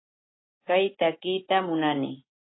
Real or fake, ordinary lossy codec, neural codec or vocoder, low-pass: real; AAC, 16 kbps; none; 7.2 kHz